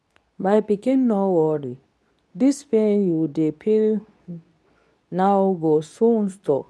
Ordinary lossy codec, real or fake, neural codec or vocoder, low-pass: none; fake; codec, 24 kHz, 0.9 kbps, WavTokenizer, medium speech release version 2; none